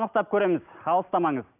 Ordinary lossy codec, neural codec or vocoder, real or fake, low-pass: none; none; real; 3.6 kHz